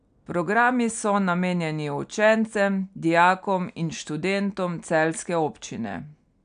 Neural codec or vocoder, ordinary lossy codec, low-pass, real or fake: none; none; 9.9 kHz; real